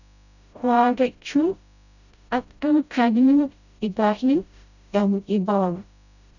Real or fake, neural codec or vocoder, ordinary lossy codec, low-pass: fake; codec, 16 kHz, 0.5 kbps, FreqCodec, smaller model; none; 7.2 kHz